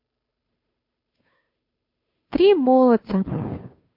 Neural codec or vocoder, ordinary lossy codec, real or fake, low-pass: codec, 16 kHz, 2 kbps, FunCodec, trained on Chinese and English, 25 frames a second; MP3, 32 kbps; fake; 5.4 kHz